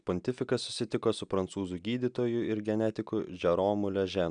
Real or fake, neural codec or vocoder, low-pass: real; none; 9.9 kHz